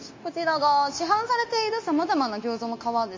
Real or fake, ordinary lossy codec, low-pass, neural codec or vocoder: fake; MP3, 32 kbps; 7.2 kHz; codec, 16 kHz, 0.9 kbps, LongCat-Audio-Codec